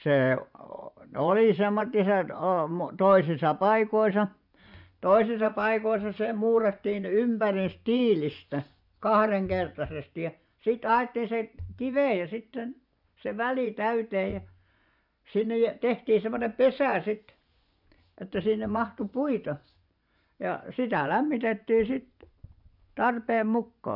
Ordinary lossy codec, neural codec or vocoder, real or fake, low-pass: none; vocoder, 24 kHz, 100 mel bands, Vocos; fake; 5.4 kHz